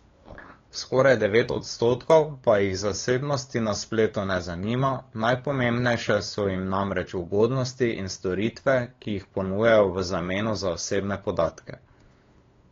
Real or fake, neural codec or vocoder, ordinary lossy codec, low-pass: fake; codec, 16 kHz, 8 kbps, FunCodec, trained on LibriTTS, 25 frames a second; AAC, 32 kbps; 7.2 kHz